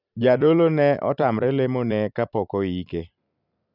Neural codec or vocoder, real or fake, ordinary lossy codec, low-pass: vocoder, 44.1 kHz, 128 mel bands every 256 samples, BigVGAN v2; fake; none; 5.4 kHz